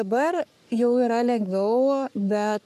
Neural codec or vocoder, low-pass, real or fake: codec, 44.1 kHz, 3.4 kbps, Pupu-Codec; 14.4 kHz; fake